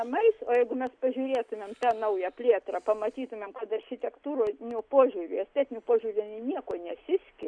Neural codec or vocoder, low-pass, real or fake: none; 9.9 kHz; real